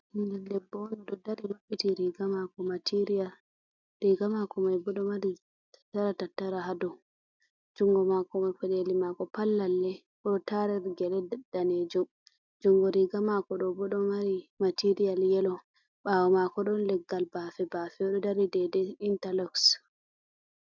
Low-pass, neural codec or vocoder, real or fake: 7.2 kHz; none; real